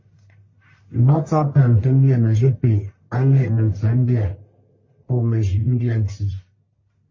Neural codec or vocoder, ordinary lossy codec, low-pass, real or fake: codec, 44.1 kHz, 1.7 kbps, Pupu-Codec; MP3, 32 kbps; 7.2 kHz; fake